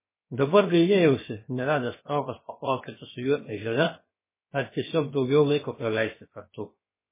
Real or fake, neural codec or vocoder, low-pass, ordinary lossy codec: fake; codec, 16 kHz, 0.7 kbps, FocalCodec; 3.6 kHz; MP3, 16 kbps